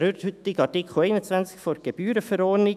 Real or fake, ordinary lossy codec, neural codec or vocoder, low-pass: fake; none; autoencoder, 48 kHz, 128 numbers a frame, DAC-VAE, trained on Japanese speech; 14.4 kHz